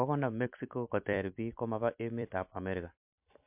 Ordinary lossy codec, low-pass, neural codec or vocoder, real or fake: MP3, 32 kbps; 3.6 kHz; vocoder, 44.1 kHz, 128 mel bands every 256 samples, BigVGAN v2; fake